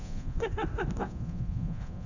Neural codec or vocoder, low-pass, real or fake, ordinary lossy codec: codec, 24 kHz, 0.9 kbps, DualCodec; 7.2 kHz; fake; none